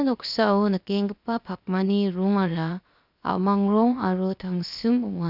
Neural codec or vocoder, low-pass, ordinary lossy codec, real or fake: codec, 16 kHz, 0.7 kbps, FocalCodec; 5.4 kHz; none; fake